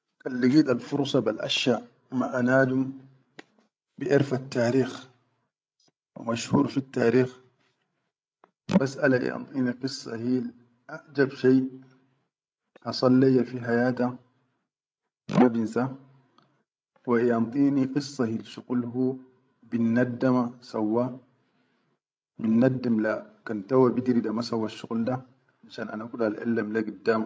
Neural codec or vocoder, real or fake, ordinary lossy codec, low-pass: codec, 16 kHz, 16 kbps, FreqCodec, larger model; fake; none; none